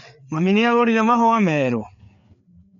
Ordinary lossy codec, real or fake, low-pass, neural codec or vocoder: none; fake; 7.2 kHz; codec, 16 kHz, 4 kbps, FreqCodec, larger model